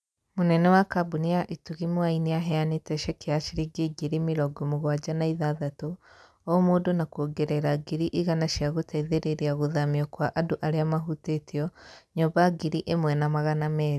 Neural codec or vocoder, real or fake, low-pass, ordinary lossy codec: none; real; none; none